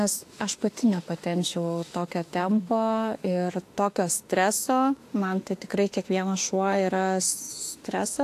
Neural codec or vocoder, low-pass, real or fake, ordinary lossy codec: autoencoder, 48 kHz, 32 numbers a frame, DAC-VAE, trained on Japanese speech; 14.4 kHz; fake; AAC, 64 kbps